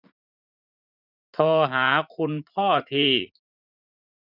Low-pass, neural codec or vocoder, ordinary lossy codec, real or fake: 5.4 kHz; vocoder, 44.1 kHz, 80 mel bands, Vocos; none; fake